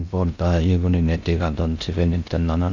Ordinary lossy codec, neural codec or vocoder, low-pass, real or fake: none; codec, 16 kHz in and 24 kHz out, 0.8 kbps, FocalCodec, streaming, 65536 codes; 7.2 kHz; fake